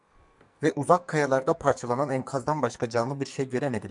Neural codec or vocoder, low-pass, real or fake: codec, 44.1 kHz, 2.6 kbps, SNAC; 10.8 kHz; fake